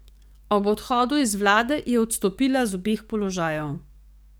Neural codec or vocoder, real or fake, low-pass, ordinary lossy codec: codec, 44.1 kHz, 7.8 kbps, DAC; fake; none; none